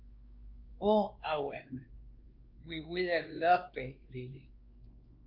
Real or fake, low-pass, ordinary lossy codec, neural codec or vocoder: fake; 5.4 kHz; Opus, 24 kbps; codec, 16 kHz, 2 kbps, X-Codec, WavLM features, trained on Multilingual LibriSpeech